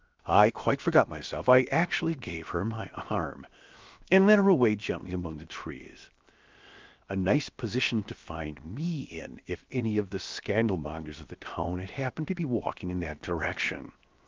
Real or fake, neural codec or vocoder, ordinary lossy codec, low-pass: fake; codec, 16 kHz, 0.7 kbps, FocalCodec; Opus, 32 kbps; 7.2 kHz